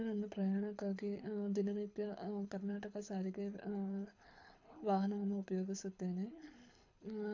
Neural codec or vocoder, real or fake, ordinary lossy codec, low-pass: codec, 24 kHz, 6 kbps, HILCodec; fake; AAC, 48 kbps; 7.2 kHz